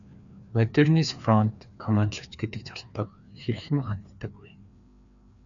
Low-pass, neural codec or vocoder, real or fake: 7.2 kHz; codec, 16 kHz, 2 kbps, FreqCodec, larger model; fake